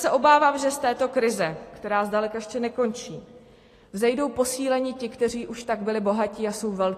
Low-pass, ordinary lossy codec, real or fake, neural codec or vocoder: 14.4 kHz; AAC, 48 kbps; real; none